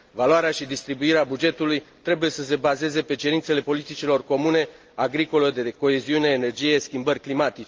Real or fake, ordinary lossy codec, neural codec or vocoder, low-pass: real; Opus, 24 kbps; none; 7.2 kHz